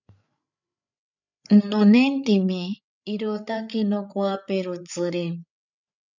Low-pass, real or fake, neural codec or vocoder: 7.2 kHz; fake; codec, 16 kHz, 8 kbps, FreqCodec, larger model